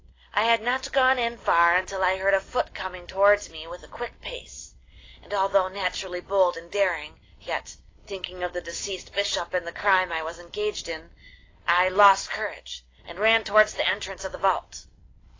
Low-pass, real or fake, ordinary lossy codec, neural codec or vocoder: 7.2 kHz; real; AAC, 32 kbps; none